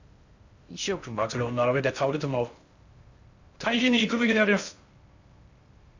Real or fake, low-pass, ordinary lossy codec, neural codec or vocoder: fake; 7.2 kHz; Opus, 64 kbps; codec, 16 kHz in and 24 kHz out, 0.6 kbps, FocalCodec, streaming, 2048 codes